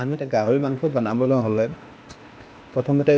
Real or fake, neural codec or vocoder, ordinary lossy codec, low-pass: fake; codec, 16 kHz, 0.8 kbps, ZipCodec; none; none